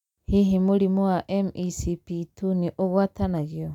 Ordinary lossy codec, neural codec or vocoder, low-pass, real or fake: none; none; 19.8 kHz; real